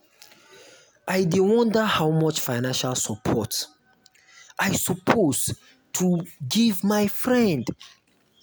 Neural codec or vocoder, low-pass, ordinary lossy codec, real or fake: none; none; none; real